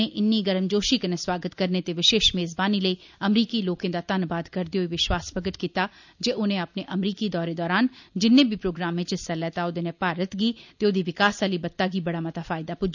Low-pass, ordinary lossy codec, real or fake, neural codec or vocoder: 7.2 kHz; none; real; none